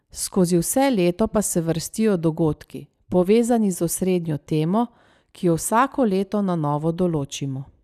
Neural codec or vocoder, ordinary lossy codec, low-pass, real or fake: none; none; 14.4 kHz; real